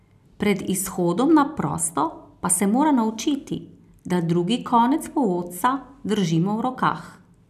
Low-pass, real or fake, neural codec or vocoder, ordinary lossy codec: 14.4 kHz; real; none; none